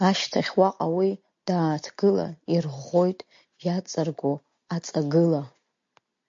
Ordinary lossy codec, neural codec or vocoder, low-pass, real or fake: MP3, 48 kbps; none; 7.2 kHz; real